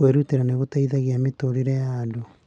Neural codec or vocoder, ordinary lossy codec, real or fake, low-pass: none; none; real; 9.9 kHz